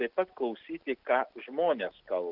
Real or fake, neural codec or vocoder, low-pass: real; none; 5.4 kHz